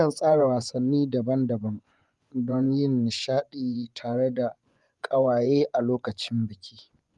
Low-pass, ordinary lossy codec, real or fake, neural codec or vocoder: 10.8 kHz; Opus, 32 kbps; fake; vocoder, 24 kHz, 100 mel bands, Vocos